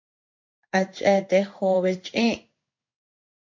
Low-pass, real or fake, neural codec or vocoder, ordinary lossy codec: 7.2 kHz; fake; codec, 16 kHz in and 24 kHz out, 1 kbps, XY-Tokenizer; MP3, 48 kbps